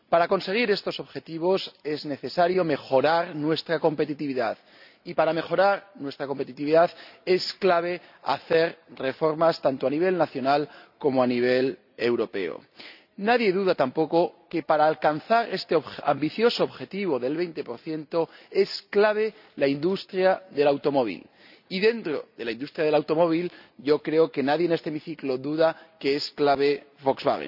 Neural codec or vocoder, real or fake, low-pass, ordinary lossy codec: none; real; 5.4 kHz; none